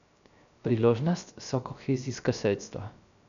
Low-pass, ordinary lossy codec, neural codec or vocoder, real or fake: 7.2 kHz; none; codec, 16 kHz, 0.3 kbps, FocalCodec; fake